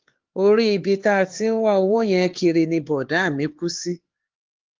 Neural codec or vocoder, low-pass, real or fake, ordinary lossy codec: codec, 16 kHz, 4 kbps, X-Codec, HuBERT features, trained on LibriSpeech; 7.2 kHz; fake; Opus, 16 kbps